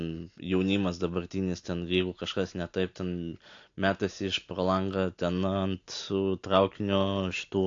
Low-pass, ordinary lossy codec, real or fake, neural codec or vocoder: 7.2 kHz; AAC, 48 kbps; real; none